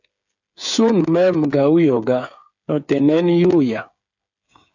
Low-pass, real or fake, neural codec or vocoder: 7.2 kHz; fake; codec, 16 kHz, 8 kbps, FreqCodec, smaller model